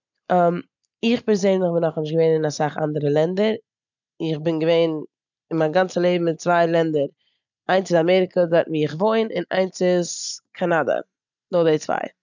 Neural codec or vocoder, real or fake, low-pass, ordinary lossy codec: none; real; 7.2 kHz; none